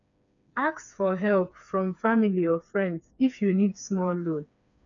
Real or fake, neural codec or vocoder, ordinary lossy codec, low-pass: fake; codec, 16 kHz, 4 kbps, FreqCodec, smaller model; none; 7.2 kHz